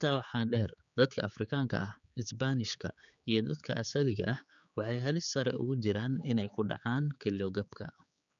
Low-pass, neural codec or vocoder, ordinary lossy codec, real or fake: 7.2 kHz; codec, 16 kHz, 4 kbps, X-Codec, HuBERT features, trained on general audio; none; fake